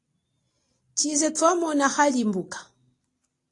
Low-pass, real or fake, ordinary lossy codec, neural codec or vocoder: 10.8 kHz; real; AAC, 48 kbps; none